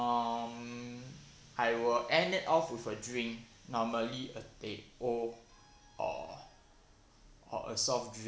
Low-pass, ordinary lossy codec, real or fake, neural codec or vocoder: none; none; real; none